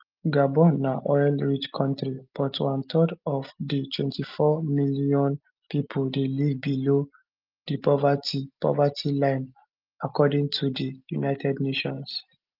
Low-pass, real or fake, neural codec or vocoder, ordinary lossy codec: 5.4 kHz; real; none; Opus, 24 kbps